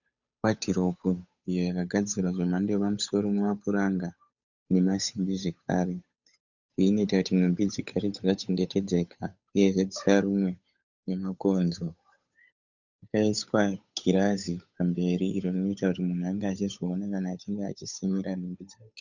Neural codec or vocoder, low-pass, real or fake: codec, 16 kHz, 8 kbps, FunCodec, trained on Chinese and English, 25 frames a second; 7.2 kHz; fake